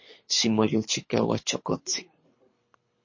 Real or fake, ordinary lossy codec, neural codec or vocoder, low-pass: fake; MP3, 32 kbps; codec, 24 kHz, 3 kbps, HILCodec; 7.2 kHz